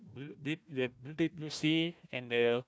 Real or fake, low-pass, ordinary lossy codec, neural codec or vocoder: fake; none; none; codec, 16 kHz, 1 kbps, FunCodec, trained on Chinese and English, 50 frames a second